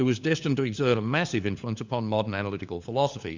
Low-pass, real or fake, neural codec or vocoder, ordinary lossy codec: 7.2 kHz; fake; codec, 16 kHz, 4 kbps, FunCodec, trained on LibriTTS, 50 frames a second; Opus, 64 kbps